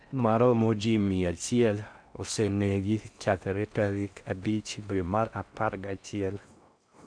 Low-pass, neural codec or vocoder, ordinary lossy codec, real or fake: 9.9 kHz; codec, 16 kHz in and 24 kHz out, 0.6 kbps, FocalCodec, streaming, 4096 codes; none; fake